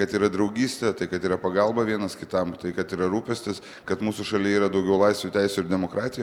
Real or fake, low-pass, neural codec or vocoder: real; 19.8 kHz; none